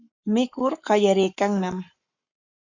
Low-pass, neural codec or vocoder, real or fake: 7.2 kHz; codec, 44.1 kHz, 7.8 kbps, Pupu-Codec; fake